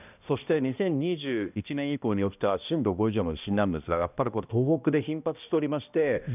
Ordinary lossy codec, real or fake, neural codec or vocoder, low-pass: none; fake; codec, 16 kHz, 1 kbps, X-Codec, HuBERT features, trained on balanced general audio; 3.6 kHz